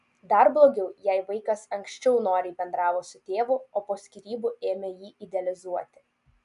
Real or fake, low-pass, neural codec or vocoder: real; 10.8 kHz; none